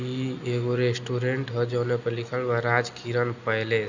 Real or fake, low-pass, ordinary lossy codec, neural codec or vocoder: real; 7.2 kHz; none; none